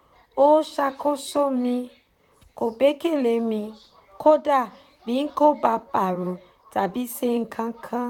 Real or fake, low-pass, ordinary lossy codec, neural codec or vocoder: fake; 19.8 kHz; none; vocoder, 44.1 kHz, 128 mel bands, Pupu-Vocoder